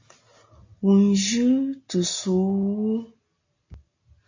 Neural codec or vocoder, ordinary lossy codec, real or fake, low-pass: none; AAC, 48 kbps; real; 7.2 kHz